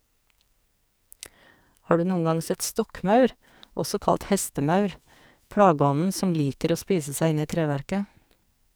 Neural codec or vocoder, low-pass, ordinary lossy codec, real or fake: codec, 44.1 kHz, 2.6 kbps, SNAC; none; none; fake